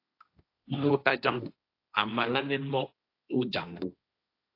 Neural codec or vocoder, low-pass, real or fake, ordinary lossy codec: codec, 16 kHz, 1.1 kbps, Voila-Tokenizer; 5.4 kHz; fake; AAC, 32 kbps